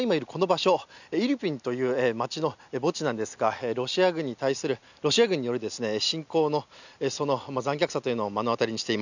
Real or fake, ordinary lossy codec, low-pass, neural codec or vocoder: real; none; 7.2 kHz; none